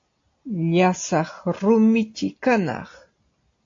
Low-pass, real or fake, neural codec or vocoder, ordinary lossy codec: 7.2 kHz; real; none; AAC, 48 kbps